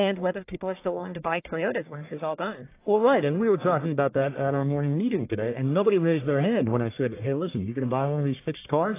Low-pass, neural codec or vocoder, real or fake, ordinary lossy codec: 3.6 kHz; codec, 44.1 kHz, 1.7 kbps, Pupu-Codec; fake; AAC, 24 kbps